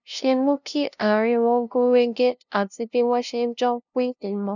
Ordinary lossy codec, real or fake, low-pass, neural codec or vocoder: none; fake; 7.2 kHz; codec, 16 kHz, 0.5 kbps, FunCodec, trained on LibriTTS, 25 frames a second